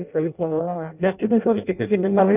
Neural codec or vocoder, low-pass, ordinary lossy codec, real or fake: codec, 16 kHz in and 24 kHz out, 0.6 kbps, FireRedTTS-2 codec; 3.6 kHz; none; fake